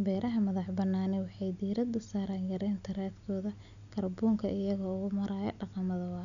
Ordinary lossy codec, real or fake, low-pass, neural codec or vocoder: none; real; 7.2 kHz; none